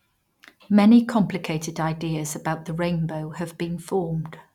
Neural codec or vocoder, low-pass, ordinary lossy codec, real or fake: none; 19.8 kHz; none; real